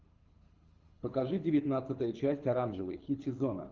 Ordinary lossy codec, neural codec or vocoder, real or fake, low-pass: Opus, 32 kbps; codec, 24 kHz, 6 kbps, HILCodec; fake; 7.2 kHz